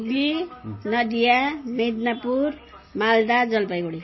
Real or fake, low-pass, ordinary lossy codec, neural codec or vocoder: real; 7.2 kHz; MP3, 24 kbps; none